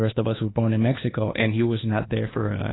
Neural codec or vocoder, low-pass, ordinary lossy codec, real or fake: codec, 24 kHz, 1.2 kbps, DualCodec; 7.2 kHz; AAC, 16 kbps; fake